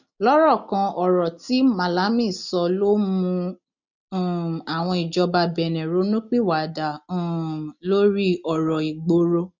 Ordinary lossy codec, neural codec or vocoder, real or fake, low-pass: none; none; real; 7.2 kHz